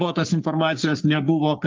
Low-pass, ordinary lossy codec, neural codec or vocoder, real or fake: 7.2 kHz; Opus, 32 kbps; codec, 44.1 kHz, 3.4 kbps, Pupu-Codec; fake